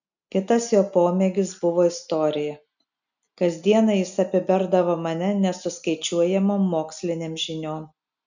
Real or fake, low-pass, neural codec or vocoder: real; 7.2 kHz; none